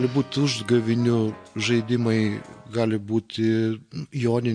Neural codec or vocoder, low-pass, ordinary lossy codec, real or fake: none; 9.9 kHz; MP3, 48 kbps; real